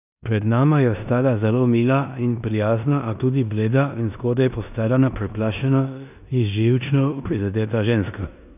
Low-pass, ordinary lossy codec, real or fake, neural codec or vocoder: 3.6 kHz; none; fake; codec, 16 kHz in and 24 kHz out, 0.9 kbps, LongCat-Audio-Codec, four codebook decoder